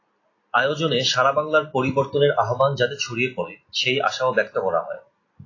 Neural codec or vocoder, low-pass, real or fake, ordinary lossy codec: none; 7.2 kHz; real; AAC, 32 kbps